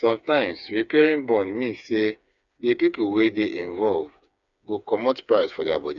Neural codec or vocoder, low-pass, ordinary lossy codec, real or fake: codec, 16 kHz, 4 kbps, FreqCodec, smaller model; 7.2 kHz; none; fake